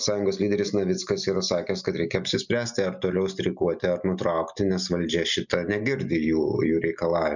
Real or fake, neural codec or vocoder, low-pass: real; none; 7.2 kHz